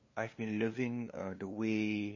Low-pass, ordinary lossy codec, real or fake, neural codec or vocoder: 7.2 kHz; MP3, 32 kbps; fake; codec, 16 kHz, 2 kbps, FunCodec, trained on LibriTTS, 25 frames a second